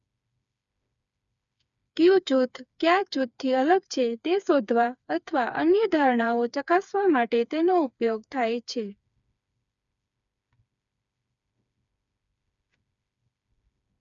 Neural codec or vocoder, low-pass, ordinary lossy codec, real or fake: codec, 16 kHz, 4 kbps, FreqCodec, smaller model; 7.2 kHz; none; fake